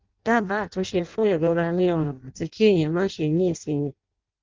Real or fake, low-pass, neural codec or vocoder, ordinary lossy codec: fake; 7.2 kHz; codec, 16 kHz in and 24 kHz out, 0.6 kbps, FireRedTTS-2 codec; Opus, 32 kbps